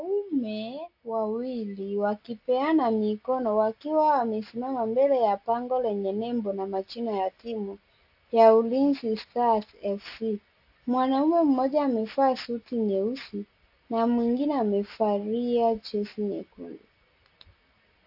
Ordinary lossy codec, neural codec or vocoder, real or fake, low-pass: AAC, 48 kbps; none; real; 5.4 kHz